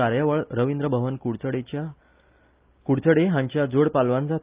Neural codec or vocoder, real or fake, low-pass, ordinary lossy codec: none; real; 3.6 kHz; Opus, 24 kbps